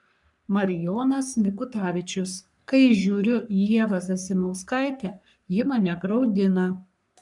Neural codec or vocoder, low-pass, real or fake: codec, 44.1 kHz, 3.4 kbps, Pupu-Codec; 10.8 kHz; fake